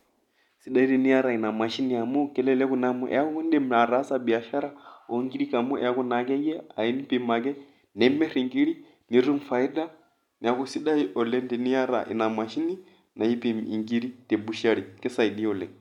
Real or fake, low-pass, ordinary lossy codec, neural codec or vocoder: real; 19.8 kHz; none; none